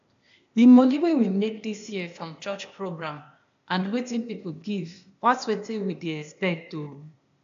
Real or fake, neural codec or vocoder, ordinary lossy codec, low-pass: fake; codec, 16 kHz, 0.8 kbps, ZipCodec; AAC, 64 kbps; 7.2 kHz